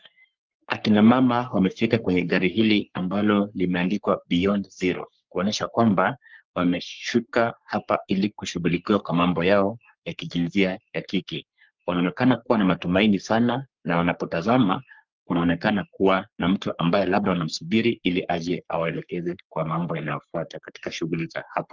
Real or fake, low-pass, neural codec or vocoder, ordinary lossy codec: fake; 7.2 kHz; codec, 44.1 kHz, 3.4 kbps, Pupu-Codec; Opus, 24 kbps